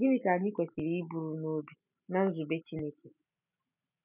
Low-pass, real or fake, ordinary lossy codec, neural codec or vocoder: 3.6 kHz; real; none; none